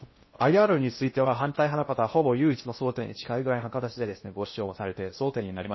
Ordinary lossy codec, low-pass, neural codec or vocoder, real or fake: MP3, 24 kbps; 7.2 kHz; codec, 16 kHz in and 24 kHz out, 0.6 kbps, FocalCodec, streaming, 2048 codes; fake